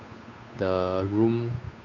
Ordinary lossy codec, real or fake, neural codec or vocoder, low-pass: none; fake; codec, 16 kHz, 8 kbps, FunCodec, trained on Chinese and English, 25 frames a second; 7.2 kHz